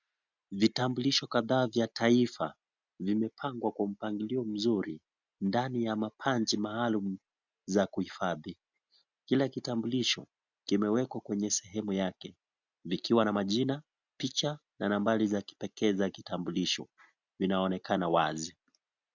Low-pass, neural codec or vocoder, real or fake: 7.2 kHz; none; real